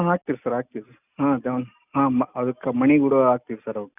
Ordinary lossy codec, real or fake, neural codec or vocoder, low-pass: none; real; none; 3.6 kHz